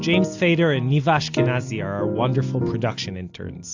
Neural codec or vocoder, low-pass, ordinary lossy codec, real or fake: none; 7.2 kHz; AAC, 48 kbps; real